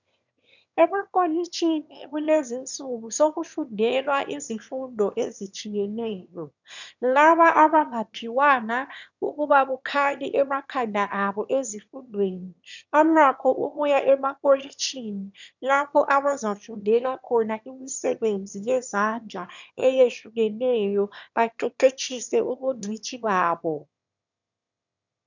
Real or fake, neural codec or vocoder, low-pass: fake; autoencoder, 22.05 kHz, a latent of 192 numbers a frame, VITS, trained on one speaker; 7.2 kHz